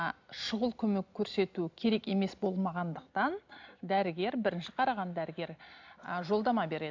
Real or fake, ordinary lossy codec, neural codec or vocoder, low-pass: real; MP3, 64 kbps; none; 7.2 kHz